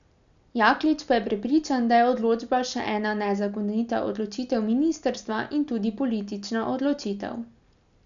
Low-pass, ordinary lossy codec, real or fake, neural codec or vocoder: 7.2 kHz; none; real; none